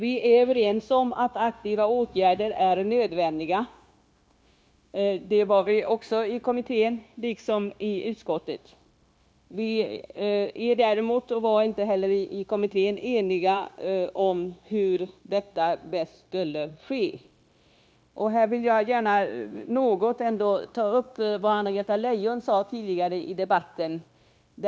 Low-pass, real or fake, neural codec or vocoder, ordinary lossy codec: none; fake; codec, 16 kHz, 0.9 kbps, LongCat-Audio-Codec; none